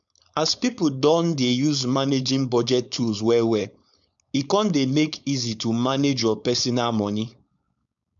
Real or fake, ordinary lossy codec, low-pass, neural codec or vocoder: fake; none; 7.2 kHz; codec, 16 kHz, 4.8 kbps, FACodec